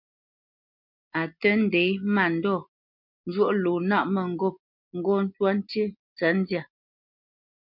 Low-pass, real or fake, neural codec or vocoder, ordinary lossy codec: 5.4 kHz; real; none; MP3, 48 kbps